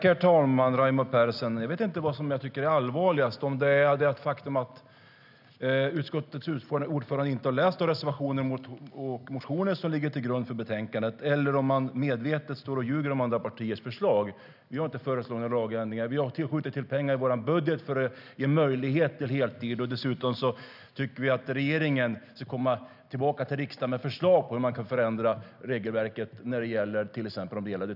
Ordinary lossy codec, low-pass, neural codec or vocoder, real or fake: none; 5.4 kHz; none; real